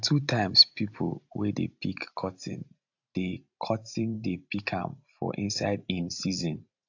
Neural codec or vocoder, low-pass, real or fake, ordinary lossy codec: vocoder, 44.1 kHz, 128 mel bands every 512 samples, BigVGAN v2; 7.2 kHz; fake; AAC, 48 kbps